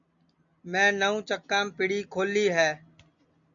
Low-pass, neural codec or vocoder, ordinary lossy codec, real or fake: 7.2 kHz; none; MP3, 64 kbps; real